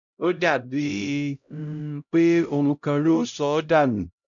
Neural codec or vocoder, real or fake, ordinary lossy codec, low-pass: codec, 16 kHz, 0.5 kbps, X-Codec, HuBERT features, trained on LibriSpeech; fake; none; 7.2 kHz